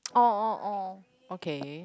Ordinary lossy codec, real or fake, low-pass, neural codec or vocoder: none; real; none; none